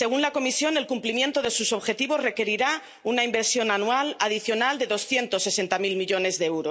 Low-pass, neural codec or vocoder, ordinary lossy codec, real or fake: none; none; none; real